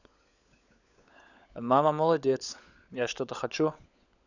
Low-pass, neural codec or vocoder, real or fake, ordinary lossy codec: 7.2 kHz; codec, 16 kHz, 16 kbps, FunCodec, trained on LibriTTS, 50 frames a second; fake; none